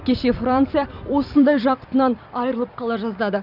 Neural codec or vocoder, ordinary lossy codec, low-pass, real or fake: vocoder, 44.1 kHz, 128 mel bands every 256 samples, BigVGAN v2; none; 5.4 kHz; fake